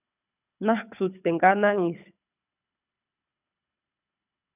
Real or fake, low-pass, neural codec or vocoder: fake; 3.6 kHz; codec, 24 kHz, 6 kbps, HILCodec